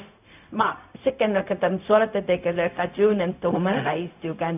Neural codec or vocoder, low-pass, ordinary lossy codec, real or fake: codec, 16 kHz, 0.4 kbps, LongCat-Audio-Codec; 3.6 kHz; none; fake